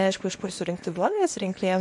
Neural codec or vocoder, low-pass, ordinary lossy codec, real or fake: codec, 24 kHz, 0.9 kbps, WavTokenizer, small release; 10.8 kHz; MP3, 48 kbps; fake